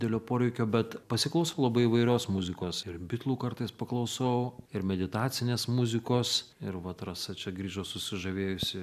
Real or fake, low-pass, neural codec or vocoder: real; 14.4 kHz; none